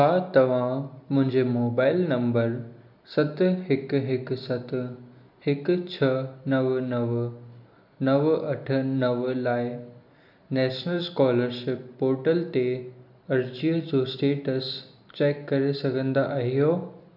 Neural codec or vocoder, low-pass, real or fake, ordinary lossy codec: none; 5.4 kHz; real; none